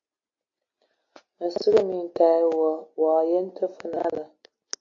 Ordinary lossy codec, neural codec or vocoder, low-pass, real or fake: AAC, 48 kbps; none; 7.2 kHz; real